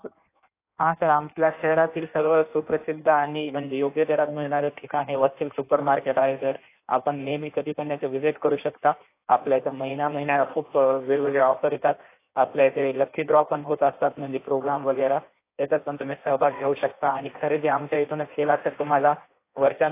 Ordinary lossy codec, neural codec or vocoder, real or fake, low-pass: AAC, 24 kbps; codec, 16 kHz in and 24 kHz out, 1.1 kbps, FireRedTTS-2 codec; fake; 3.6 kHz